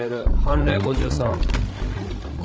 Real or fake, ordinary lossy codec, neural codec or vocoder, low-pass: fake; none; codec, 16 kHz, 16 kbps, FreqCodec, larger model; none